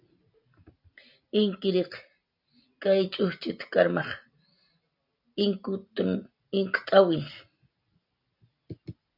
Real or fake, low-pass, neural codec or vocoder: real; 5.4 kHz; none